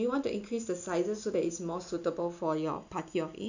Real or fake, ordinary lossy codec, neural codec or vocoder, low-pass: real; none; none; 7.2 kHz